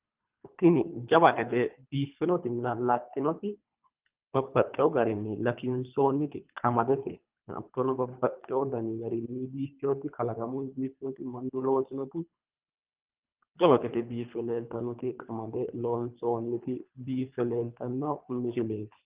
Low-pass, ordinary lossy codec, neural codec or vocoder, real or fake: 3.6 kHz; Opus, 24 kbps; codec, 24 kHz, 3 kbps, HILCodec; fake